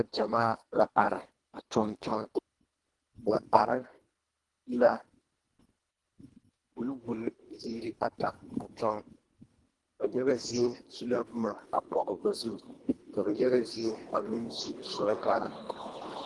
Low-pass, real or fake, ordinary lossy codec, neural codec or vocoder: 10.8 kHz; fake; Opus, 24 kbps; codec, 24 kHz, 1.5 kbps, HILCodec